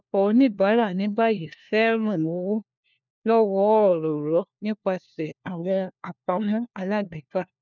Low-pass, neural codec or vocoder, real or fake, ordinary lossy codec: 7.2 kHz; codec, 16 kHz, 1 kbps, FunCodec, trained on LibriTTS, 50 frames a second; fake; none